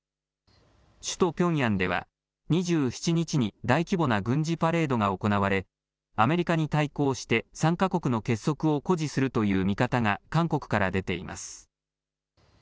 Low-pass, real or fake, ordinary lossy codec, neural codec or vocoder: none; real; none; none